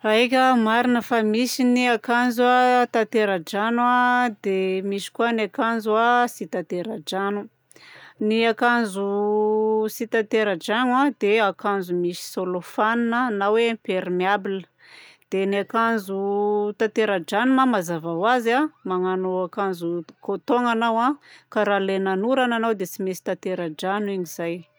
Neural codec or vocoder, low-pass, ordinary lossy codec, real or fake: none; none; none; real